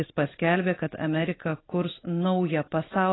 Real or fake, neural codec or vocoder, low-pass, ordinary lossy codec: real; none; 7.2 kHz; AAC, 16 kbps